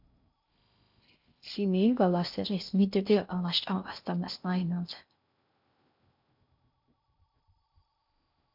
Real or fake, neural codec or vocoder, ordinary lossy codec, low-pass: fake; codec, 16 kHz in and 24 kHz out, 0.6 kbps, FocalCodec, streaming, 2048 codes; MP3, 48 kbps; 5.4 kHz